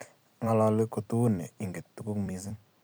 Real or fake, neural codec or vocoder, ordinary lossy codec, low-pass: fake; vocoder, 44.1 kHz, 128 mel bands every 256 samples, BigVGAN v2; none; none